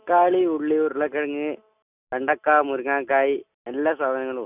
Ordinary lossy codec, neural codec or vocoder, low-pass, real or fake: none; none; 3.6 kHz; real